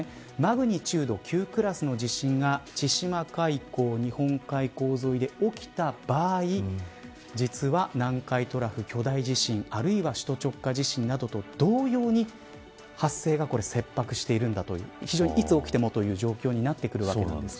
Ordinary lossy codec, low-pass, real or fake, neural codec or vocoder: none; none; real; none